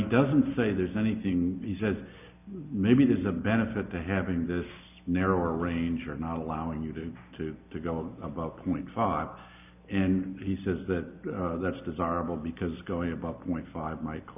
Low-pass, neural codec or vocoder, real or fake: 3.6 kHz; none; real